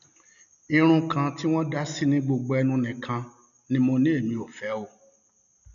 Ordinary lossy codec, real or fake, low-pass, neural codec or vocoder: none; real; 7.2 kHz; none